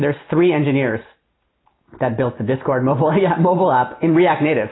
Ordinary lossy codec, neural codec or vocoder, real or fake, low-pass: AAC, 16 kbps; none; real; 7.2 kHz